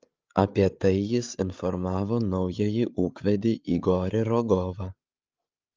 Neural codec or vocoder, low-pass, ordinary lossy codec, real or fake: codec, 16 kHz, 8 kbps, FreqCodec, larger model; 7.2 kHz; Opus, 24 kbps; fake